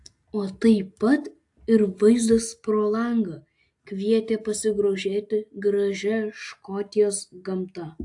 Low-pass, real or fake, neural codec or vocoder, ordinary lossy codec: 10.8 kHz; real; none; AAC, 64 kbps